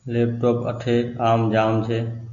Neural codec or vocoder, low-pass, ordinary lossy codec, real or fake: none; 7.2 kHz; MP3, 96 kbps; real